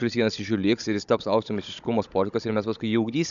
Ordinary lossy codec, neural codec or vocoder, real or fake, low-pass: MP3, 96 kbps; codec, 16 kHz, 16 kbps, FunCodec, trained on Chinese and English, 50 frames a second; fake; 7.2 kHz